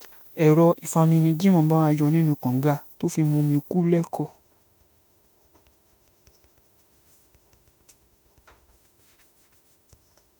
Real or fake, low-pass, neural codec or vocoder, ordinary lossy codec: fake; none; autoencoder, 48 kHz, 32 numbers a frame, DAC-VAE, trained on Japanese speech; none